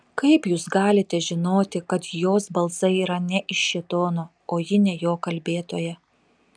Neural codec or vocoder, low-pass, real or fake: none; 9.9 kHz; real